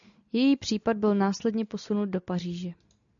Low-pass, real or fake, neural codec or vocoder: 7.2 kHz; real; none